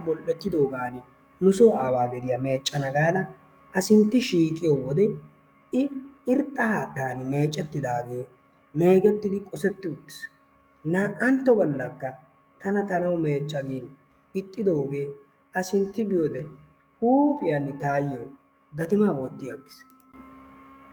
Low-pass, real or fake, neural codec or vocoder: 19.8 kHz; fake; codec, 44.1 kHz, 7.8 kbps, DAC